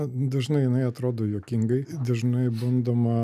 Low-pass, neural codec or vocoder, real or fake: 14.4 kHz; none; real